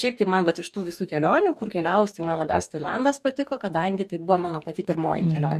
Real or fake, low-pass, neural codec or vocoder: fake; 14.4 kHz; codec, 44.1 kHz, 2.6 kbps, DAC